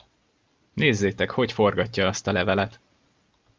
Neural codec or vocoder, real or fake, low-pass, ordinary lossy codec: none; real; 7.2 kHz; Opus, 24 kbps